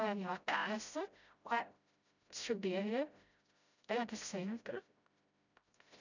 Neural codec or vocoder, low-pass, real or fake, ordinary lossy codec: codec, 16 kHz, 0.5 kbps, FreqCodec, smaller model; 7.2 kHz; fake; none